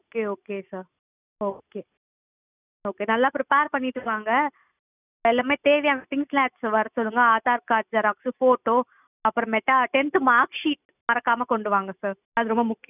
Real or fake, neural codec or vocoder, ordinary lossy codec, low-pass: real; none; none; 3.6 kHz